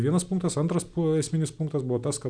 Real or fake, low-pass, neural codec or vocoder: real; 9.9 kHz; none